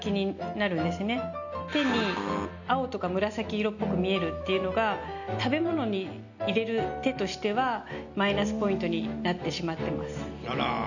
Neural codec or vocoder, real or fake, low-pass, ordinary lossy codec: none; real; 7.2 kHz; none